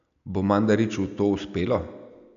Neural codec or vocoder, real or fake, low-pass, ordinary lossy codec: none; real; 7.2 kHz; none